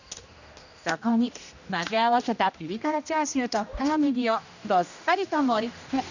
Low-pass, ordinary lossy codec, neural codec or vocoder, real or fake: 7.2 kHz; none; codec, 16 kHz, 1 kbps, X-Codec, HuBERT features, trained on general audio; fake